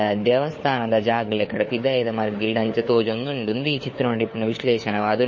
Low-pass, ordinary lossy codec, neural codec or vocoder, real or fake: 7.2 kHz; MP3, 32 kbps; codec, 16 kHz, 4 kbps, FunCodec, trained on LibriTTS, 50 frames a second; fake